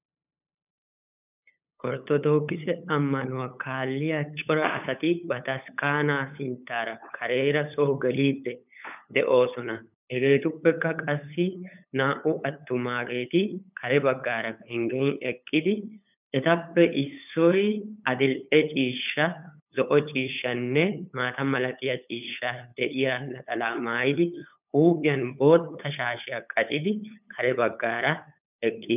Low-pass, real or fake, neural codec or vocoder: 3.6 kHz; fake; codec, 16 kHz, 8 kbps, FunCodec, trained on LibriTTS, 25 frames a second